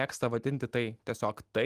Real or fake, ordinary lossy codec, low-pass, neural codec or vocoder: real; Opus, 32 kbps; 14.4 kHz; none